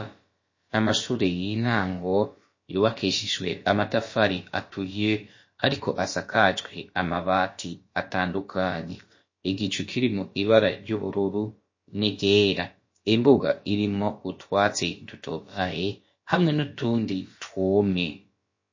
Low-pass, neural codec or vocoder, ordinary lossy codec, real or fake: 7.2 kHz; codec, 16 kHz, about 1 kbps, DyCAST, with the encoder's durations; MP3, 32 kbps; fake